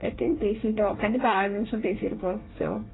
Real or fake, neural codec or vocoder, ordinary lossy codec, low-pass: fake; codec, 24 kHz, 1 kbps, SNAC; AAC, 16 kbps; 7.2 kHz